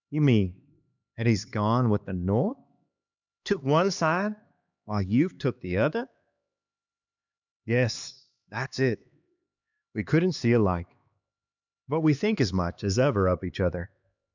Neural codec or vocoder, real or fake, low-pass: codec, 16 kHz, 2 kbps, X-Codec, HuBERT features, trained on LibriSpeech; fake; 7.2 kHz